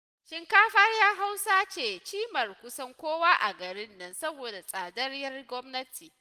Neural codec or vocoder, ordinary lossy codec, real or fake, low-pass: none; Opus, 24 kbps; real; 14.4 kHz